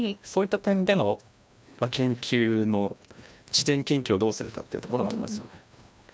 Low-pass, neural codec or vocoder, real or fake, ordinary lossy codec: none; codec, 16 kHz, 1 kbps, FreqCodec, larger model; fake; none